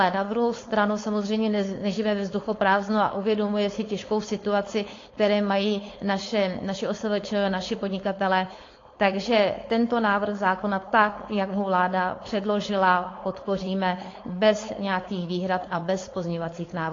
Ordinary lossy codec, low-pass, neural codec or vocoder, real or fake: AAC, 32 kbps; 7.2 kHz; codec, 16 kHz, 4.8 kbps, FACodec; fake